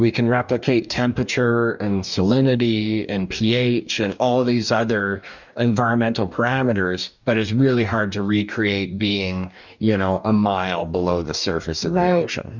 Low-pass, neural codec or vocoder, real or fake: 7.2 kHz; codec, 44.1 kHz, 2.6 kbps, DAC; fake